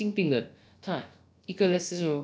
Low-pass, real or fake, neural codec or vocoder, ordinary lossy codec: none; fake; codec, 16 kHz, about 1 kbps, DyCAST, with the encoder's durations; none